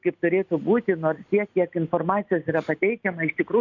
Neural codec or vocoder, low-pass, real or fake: none; 7.2 kHz; real